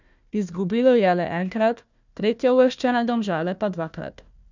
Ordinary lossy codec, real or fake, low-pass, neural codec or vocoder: none; fake; 7.2 kHz; codec, 16 kHz, 1 kbps, FunCodec, trained on Chinese and English, 50 frames a second